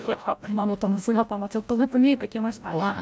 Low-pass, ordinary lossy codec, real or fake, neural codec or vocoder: none; none; fake; codec, 16 kHz, 0.5 kbps, FreqCodec, larger model